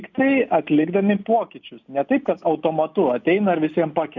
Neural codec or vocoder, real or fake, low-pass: none; real; 7.2 kHz